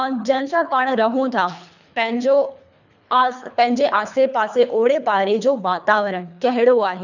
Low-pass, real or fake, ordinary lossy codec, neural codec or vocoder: 7.2 kHz; fake; none; codec, 24 kHz, 3 kbps, HILCodec